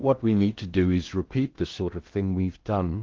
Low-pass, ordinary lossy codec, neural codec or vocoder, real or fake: 7.2 kHz; Opus, 16 kbps; codec, 16 kHz in and 24 kHz out, 0.6 kbps, FocalCodec, streaming, 2048 codes; fake